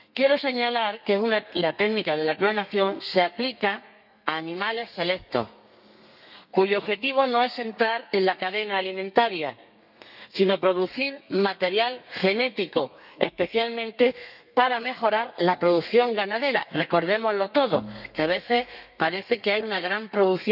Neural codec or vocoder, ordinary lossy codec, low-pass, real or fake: codec, 32 kHz, 1.9 kbps, SNAC; none; 5.4 kHz; fake